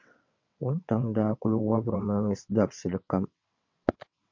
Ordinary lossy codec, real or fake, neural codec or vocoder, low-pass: MP3, 48 kbps; fake; vocoder, 22.05 kHz, 80 mel bands, WaveNeXt; 7.2 kHz